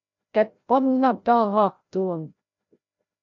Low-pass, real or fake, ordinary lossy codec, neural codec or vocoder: 7.2 kHz; fake; MP3, 48 kbps; codec, 16 kHz, 0.5 kbps, FreqCodec, larger model